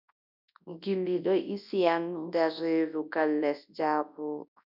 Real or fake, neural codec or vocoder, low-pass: fake; codec, 24 kHz, 0.9 kbps, WavTokenizer, large speech release; 5.4 kHz